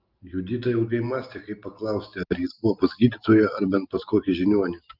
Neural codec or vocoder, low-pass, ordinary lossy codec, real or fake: none; 5.4 kHz; Opus, 24 kbps; real